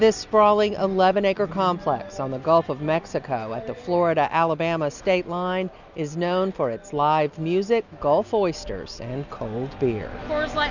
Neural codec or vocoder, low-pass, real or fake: none; 7.2 kHz; real